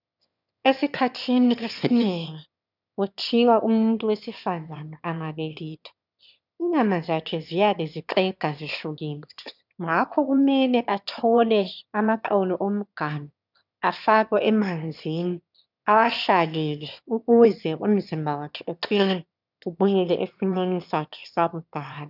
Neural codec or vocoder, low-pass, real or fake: autoencoder, 22.05 kHz, a latent of 192 numbers a frame, VITS, trained on one speaker; 5.4 kHz; fake